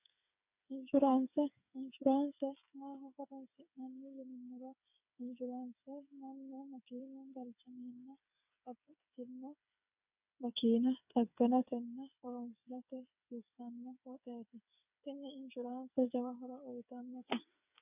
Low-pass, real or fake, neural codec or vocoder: 3.6 kHz; fake; codec, 16 kHz, 8 kbps, FreqCodec, smaller model